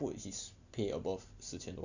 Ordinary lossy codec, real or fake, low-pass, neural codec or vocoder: none; real; 7.2 kHz; none